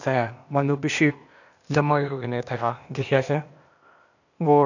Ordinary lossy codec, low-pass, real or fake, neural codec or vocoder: none; 7.2 kHz; fake; codec, 16 kHz, 0.8 kbps, ZipCodec